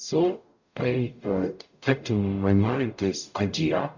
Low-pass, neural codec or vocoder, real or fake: 7.2 kHz; codec, 44.1 kHz, 0.9 kbps, DAC; fake